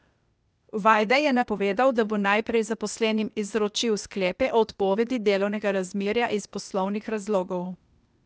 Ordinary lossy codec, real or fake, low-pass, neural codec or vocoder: none; fake; none; codec, 16 kHz, 0.8 kbps, ZipCodec